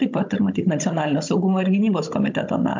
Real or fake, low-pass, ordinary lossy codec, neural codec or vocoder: fake; 7.2 kHz; MP3, 64 kbps; codec, 16 kHz, 16 kbps, FunCodec, trained on Chinese and English, 50 frames a second